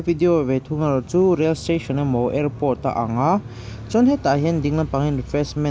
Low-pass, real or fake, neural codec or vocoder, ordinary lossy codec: none; real; none; none